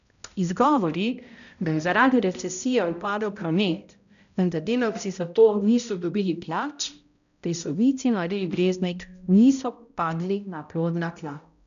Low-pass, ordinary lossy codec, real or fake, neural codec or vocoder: 7.2 kHz; none; fake; codec, 16 kHz, 0.5 kbps, X-Codec, HuBERT features, trained on balanced general audio